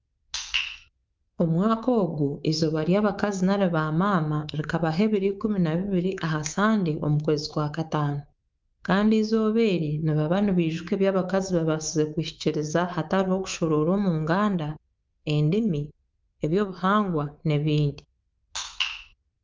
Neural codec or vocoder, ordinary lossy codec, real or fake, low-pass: codec, 24 kHz, 3.1 kbps, DualCodec; Opus, 32 kbps; fake; 7.2 kHz